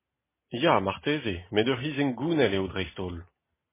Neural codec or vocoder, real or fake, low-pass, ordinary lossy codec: none; real; 3.6 kHz; MP3, 16 kbps